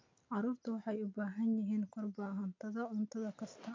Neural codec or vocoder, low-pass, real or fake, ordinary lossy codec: none; 7.2 kHz; real; MP3, 48 kbps